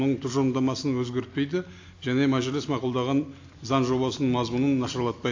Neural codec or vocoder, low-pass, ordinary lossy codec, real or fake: none; 7.2 kHz; AAC, 48 kbps; real